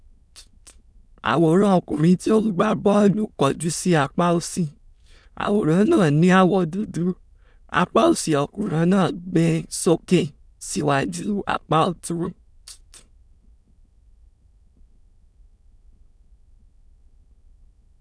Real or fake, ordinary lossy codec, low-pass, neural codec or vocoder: fake; none; none; autoencoder, 22.05 kHz, a latent of 192 numbers a frame, VITS, trained on many speakers